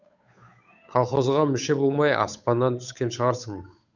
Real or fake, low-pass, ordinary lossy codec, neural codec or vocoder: fake; 7.2 kHz; none; codec, 16 kHz, 8 kbps, FunCodec, trained on Chinese and English, 25 frames a second